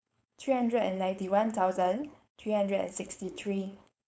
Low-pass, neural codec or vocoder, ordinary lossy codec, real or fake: none; codec, 16 kHz, 4.8 kbps, FACodec; none; fake